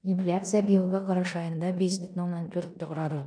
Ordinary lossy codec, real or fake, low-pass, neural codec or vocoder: none; fake; 9.9 kHz; codec, 16 kHz in and 24 kHz out, 0.9 kbps, LongCat-Audio-Codec, four codebook decoder